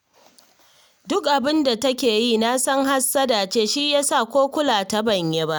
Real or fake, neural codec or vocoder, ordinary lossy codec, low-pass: real; none; none; none